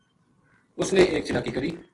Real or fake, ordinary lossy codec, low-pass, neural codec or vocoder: real; AAC, 32 kbps; 10.8 kHz; none